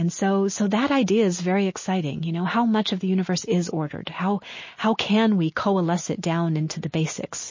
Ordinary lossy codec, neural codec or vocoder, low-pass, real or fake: MP3, 32 kbps; none; 7.2 kHz; real